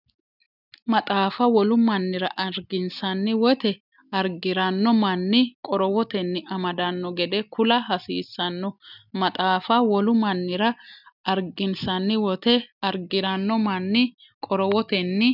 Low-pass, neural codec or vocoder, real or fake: 5.4 kHz; none; real